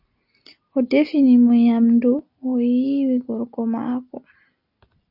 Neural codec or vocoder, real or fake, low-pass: none; real; 5.4 kHz